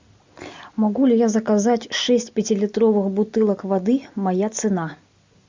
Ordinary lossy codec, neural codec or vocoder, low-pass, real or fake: MP3, 64 kbps; none; 7.2 kHz; real